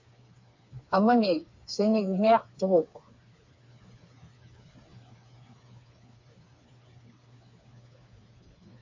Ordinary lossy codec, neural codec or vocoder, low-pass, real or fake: MP3, 48 kbps; codec, 16 kHz, 4 kbps, FreqCodec, smaller model; 7.2 kHz; fake